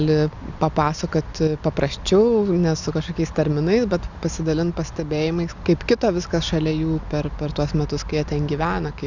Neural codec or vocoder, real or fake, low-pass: none; real; 7.2 kHz